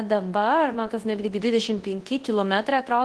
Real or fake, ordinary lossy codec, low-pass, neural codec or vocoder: fake; Opus, 16 kbps; 10.8 kHz; codec, 24 kHz, 0.9 kbps, WavTokenizer, large speech release